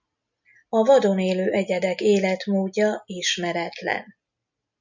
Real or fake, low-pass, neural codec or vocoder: real; 7.2 kHz; none